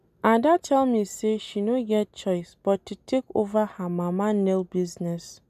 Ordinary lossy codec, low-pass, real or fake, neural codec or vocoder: none; 14.4 kHz; real; none